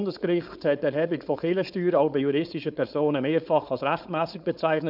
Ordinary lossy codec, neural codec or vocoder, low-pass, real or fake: none; codec, 16 kHz, 4.8 kbps, FACodec; 5.4 kHz; fake